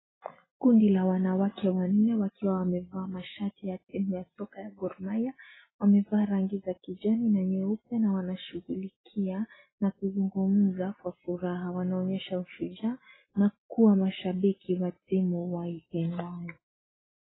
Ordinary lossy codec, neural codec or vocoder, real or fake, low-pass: AAC, 16 kbps; none; real; 7.2 kHz